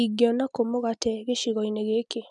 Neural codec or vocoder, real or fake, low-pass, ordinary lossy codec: none; real; 9.9 kHz; none